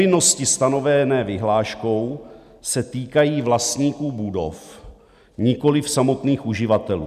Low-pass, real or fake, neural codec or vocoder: 14.4 kHz; real; none